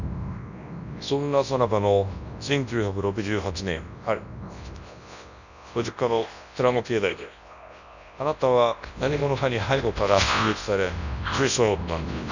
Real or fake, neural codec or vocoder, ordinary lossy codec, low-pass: fake; codec, 24 kHz, 0.9 kbps, WavTokenizer, large speech release; none; 7.2 kHz